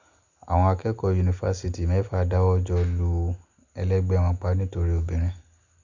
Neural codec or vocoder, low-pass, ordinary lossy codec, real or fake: none; 7.2 kHz; Opus, 64 kbps; real